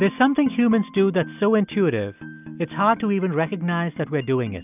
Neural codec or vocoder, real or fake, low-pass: none; real; 3.6 kHz